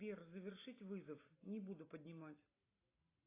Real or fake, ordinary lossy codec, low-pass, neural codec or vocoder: real; AAC, 16 kbps; 3.6 kHz; none